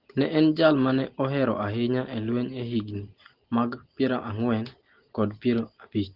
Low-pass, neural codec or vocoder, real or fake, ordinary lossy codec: 5.4 kHz; none; real; Opus, 16 kbps